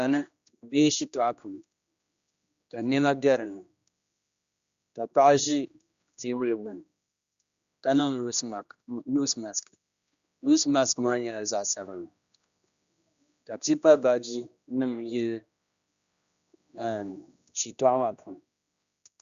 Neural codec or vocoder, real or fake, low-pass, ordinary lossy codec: codec, 16 kHz, 1 kbps, X-Codec, HuBERT features, trained on general audio; fake; 7.2 kHz; Opus, 64 kbps